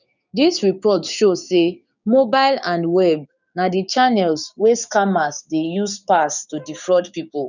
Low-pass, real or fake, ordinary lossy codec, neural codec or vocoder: 7.2 kHz; fake; none; codec, 16 kHz, 6 kbps, DAC